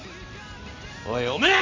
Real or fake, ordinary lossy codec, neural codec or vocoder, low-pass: real; MP3, 64 kbps; none; 7.2 kHz